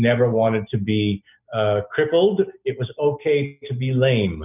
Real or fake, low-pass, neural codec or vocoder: real; 3.6 kHz; none